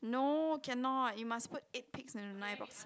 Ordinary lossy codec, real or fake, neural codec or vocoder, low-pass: none; real; none; none